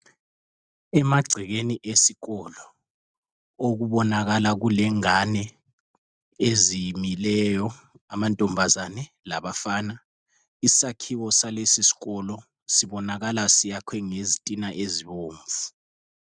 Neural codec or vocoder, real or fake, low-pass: none; real; 9.9 kHz